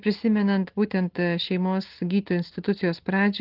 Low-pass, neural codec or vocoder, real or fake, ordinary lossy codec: 5.4 kHz; none; real; Opus, 16 kbps